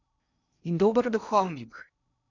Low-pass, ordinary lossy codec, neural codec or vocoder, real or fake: 7.2 kHz; none; codec, 16 kHz in and 24 kHz out, 0.6 kbps, FocalCodec, streaming, 2048 codes; fake